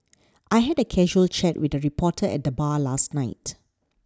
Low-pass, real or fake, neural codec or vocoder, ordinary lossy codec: none; real; none; none